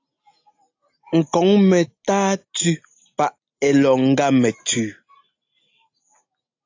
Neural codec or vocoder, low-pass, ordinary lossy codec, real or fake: none; 7.2 kHz; AAC, 48 kbps; real